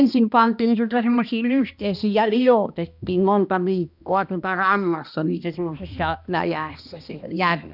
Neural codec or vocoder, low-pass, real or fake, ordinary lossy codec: codec, 16 kHz, 1 kbps, X-Codec, HuBERT features, trained on balanced general audio; 5.4 kHz; fake; none